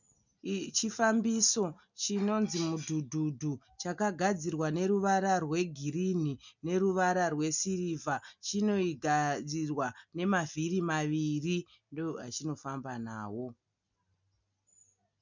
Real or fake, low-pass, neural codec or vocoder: real; 7.2 kHz; none